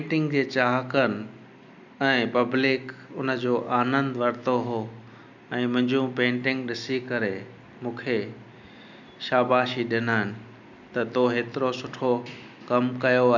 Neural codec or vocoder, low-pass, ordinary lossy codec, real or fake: none; 7.2 kHz; none; real